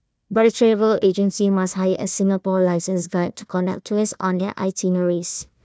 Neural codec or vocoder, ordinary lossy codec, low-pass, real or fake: codec, 16 kHz, 1 kbps, FunCodec, trained on Chinese and English, 50 frames a second; none; none; fake